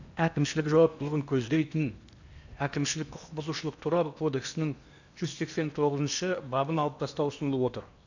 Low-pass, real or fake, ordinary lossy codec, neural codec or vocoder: 7.2 kHz; fake; none; codec, 16 kHz in and 24 kHz out, 0.8 kbps, FocalCodec, streaming, 65536 codes